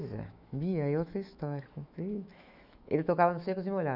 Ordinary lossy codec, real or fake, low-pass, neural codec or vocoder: none; fake; 5.4 kHz; autoencoder, 48 kHz, 128 numbers a frame, DAC-VAE, trained on Japanese speech